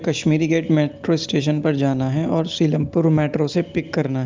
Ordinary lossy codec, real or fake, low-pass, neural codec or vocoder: Opus, 32 kbps; real; 7.2 kHz; none